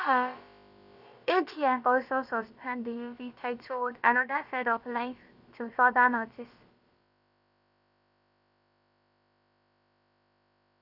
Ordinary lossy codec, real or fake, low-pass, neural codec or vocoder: Opus, 64 kbps; fake; 5.4 kHz; codec, 16 kHz, about 1 kbps, DyCAST, with the encoder's durations